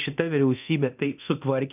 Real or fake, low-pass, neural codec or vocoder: fake; 3.6 kHz; codec, 24 kHz, 0.9 kbps, DualCodec